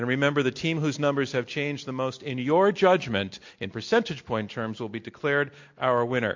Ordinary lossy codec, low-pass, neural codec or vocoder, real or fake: MP3, 48 kbps; 7.2 kHz; none; real